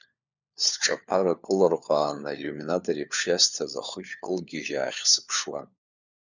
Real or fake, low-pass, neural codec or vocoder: fake; 7.2 kHz; codec, 16 kHz, 4 kbps, FunCodec, trained on LibriTTS, 50 frames a second